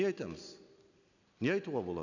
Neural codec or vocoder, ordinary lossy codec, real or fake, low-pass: none; none; real; 7.2 kHz